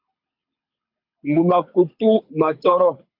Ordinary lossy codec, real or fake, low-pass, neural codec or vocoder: MP3, 48 kbps; fake; 5.4 kHz; codec, 24 kHz, 6 kbps, HILCodec